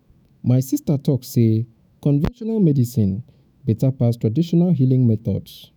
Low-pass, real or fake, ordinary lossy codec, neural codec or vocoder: none; fake; none; autoencoder, 48 kHz, 128 numbers a frame, DAC-VAE, trained on Japanese speech